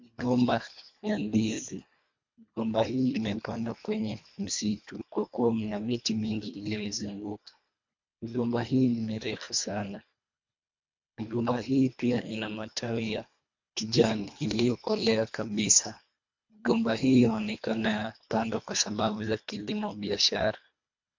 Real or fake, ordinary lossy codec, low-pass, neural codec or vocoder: fake; MP3, 48 kbps; 7.2 kHz; codec, 24 kHz, 1.5 kbps, HILCodec